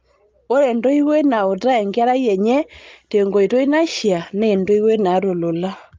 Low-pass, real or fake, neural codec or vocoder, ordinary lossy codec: 7.2 kHz; real; none; Opus, 32 kbps